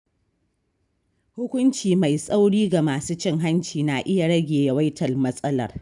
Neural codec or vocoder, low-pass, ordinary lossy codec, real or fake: none; 10.8 kHz; none; real